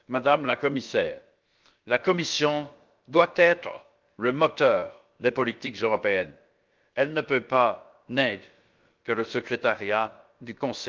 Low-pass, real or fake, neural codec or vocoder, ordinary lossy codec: 7.2 kHz; fake; codec, 16 kHz, about 1 kbps, DyCAST, with the encoder's durations; Opus, 24 kbps